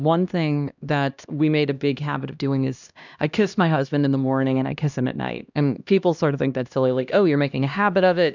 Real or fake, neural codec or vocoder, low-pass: fake; codec, 16 kHz, 1 kbps, X-Codec, HuBERT features, trained on LibriSpeech; 7.2 kHz